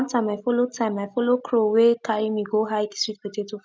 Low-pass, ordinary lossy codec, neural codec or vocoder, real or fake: none; none; none; real